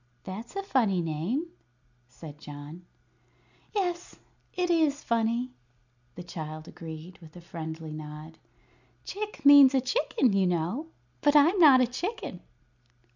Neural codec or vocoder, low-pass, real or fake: none; 7.2 kHz; real